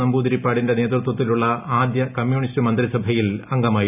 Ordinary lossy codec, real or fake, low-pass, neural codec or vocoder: none; real; 3.6 kHz; none